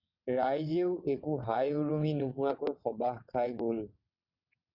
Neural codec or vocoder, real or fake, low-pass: vocoder, 22.05 kHz, 80 mel bands, WaveNeXt; fake; 5.4 kHz